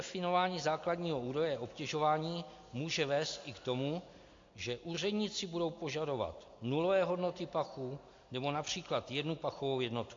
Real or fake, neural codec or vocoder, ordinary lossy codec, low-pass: real; none; AAC, 48 kbps; 7.2 kHz